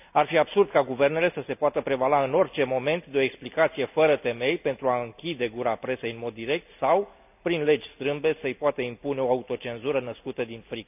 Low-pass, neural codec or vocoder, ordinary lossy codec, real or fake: 3.6 kHz; none; none; real